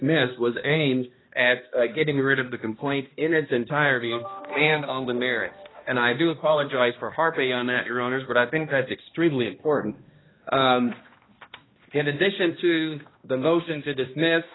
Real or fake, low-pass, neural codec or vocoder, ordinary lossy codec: fake; 7.2 kHz; codec, 16 kHz, 1 kbps, X-Codec, HuBERT features, trained on balanced general audio; AAC, 16 kbps